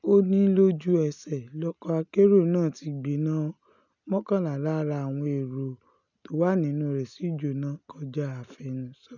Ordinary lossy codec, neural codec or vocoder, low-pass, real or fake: none; none; 7.2 kHz; real